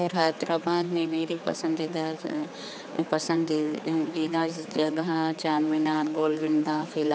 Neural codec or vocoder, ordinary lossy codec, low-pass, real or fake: codec, 16 kHz, 4 kbps, X-Codec, HuBERT features, trained on general audio; none; none; fake